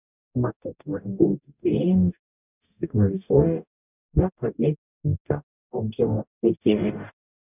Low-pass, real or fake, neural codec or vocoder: 3.6 kHz; fake; codec, 44.1 kHz, 0.9 kbps, DAC